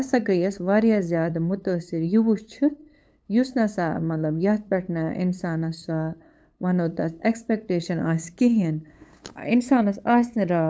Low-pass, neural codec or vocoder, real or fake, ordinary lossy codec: none; codec, 16 kHz, 8 kbps, FunCodec, trained on LibriTTS, 25 frames a second; fake; none